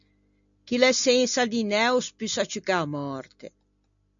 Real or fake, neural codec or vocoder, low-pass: real; none; 7.2 kHz